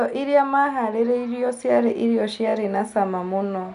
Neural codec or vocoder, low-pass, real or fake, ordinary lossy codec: none; 10.8 kHz; real; AAC, 96 kbps